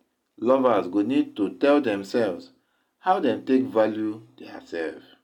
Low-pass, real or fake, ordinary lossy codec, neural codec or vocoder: 19.8 kHz; real; none; none